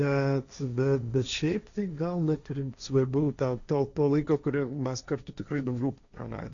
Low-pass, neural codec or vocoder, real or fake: 7.2 kHz; codec, 16 kHz, 1.1 kbps, Voila-Tokenizer; fake